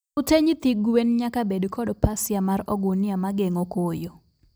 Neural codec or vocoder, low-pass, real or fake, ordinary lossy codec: none; none; real; none